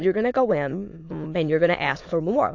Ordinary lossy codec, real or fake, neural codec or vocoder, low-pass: AAC, 48 kbps; fake; autoencoder, 22.05 kHz, a latent of 192 numbers a frame, VITS, trained on many speakers; 7.2 kHz